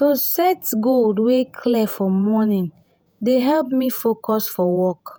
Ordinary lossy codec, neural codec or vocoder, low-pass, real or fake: none; vocoder, 48 kHz, 128 mel bands, Vocos; none; fake